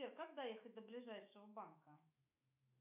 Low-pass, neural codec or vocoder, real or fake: 3.6 kHz; autoencoder, 48 kHz, 128 numbers a frame, DAC-VAE, trained on Japanese speech; fake